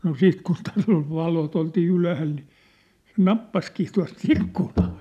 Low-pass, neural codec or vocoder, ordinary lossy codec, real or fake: 14.4 kHz; none; none; real